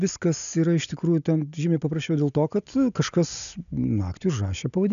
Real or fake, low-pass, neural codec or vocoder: real; 7.2 kHz; none